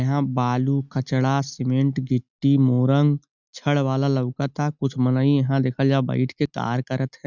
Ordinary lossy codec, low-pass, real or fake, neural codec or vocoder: none; 7.2 kHz; real; none